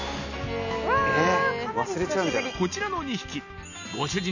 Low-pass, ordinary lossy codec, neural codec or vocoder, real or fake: 7.2 kHz; none; none; real